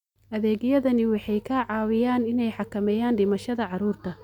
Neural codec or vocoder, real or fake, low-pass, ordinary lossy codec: none; real; 19.8 kHz; none